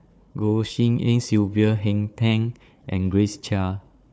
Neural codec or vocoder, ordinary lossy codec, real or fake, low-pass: codec, 16 kHz, 4 kbps, FunCodec, trained on Chinese and English, 50 frames a second; none; fake; none